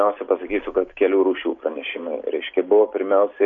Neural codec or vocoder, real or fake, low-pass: none; real; 7.2 kHz